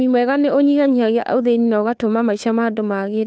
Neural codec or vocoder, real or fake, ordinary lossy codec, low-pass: codec, 16 kHz, 2 kbps, FunCodec, trained on Chinese and English, 25 frames a second; fake; none; none